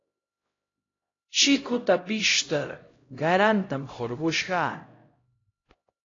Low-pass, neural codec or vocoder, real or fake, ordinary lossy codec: 7.2 kHz; codec, 16 kHz, 0.5 kbps, X-Codec, HuBERT features, trained on LibriSpeech; fake; AAC, 32 kbps